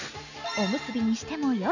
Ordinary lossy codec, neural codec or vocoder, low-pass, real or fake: none; none; 7.2 kHz; real